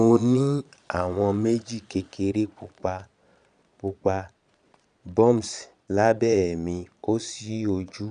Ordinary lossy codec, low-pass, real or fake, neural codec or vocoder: none; 9.9 kHz; fake; vocoder, 22.05 kHz, 80 mel bands, Vocos